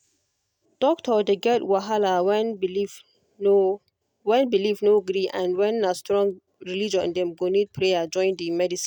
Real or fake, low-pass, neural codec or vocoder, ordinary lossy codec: real; 19.8 kHz; none; none